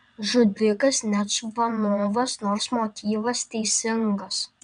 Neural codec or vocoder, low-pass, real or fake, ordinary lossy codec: vocoder, 22.05 kHz, 80 mel bands, WaveNeXt; 9.9 kHz; fake; MP3, 96 kbps